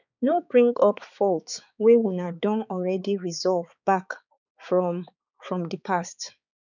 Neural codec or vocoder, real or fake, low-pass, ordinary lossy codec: codec, 16 kHz, 4 kbps, X-Codec, HuBERT features, trained on balanced general audio; fake; 7.2 kHz; none